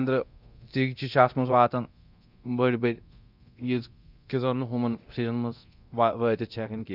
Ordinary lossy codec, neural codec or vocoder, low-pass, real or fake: Opus, 64 kbps; codec, 24 kHz, 0.9 kbps, DualCodec; 5.4 kHz; fake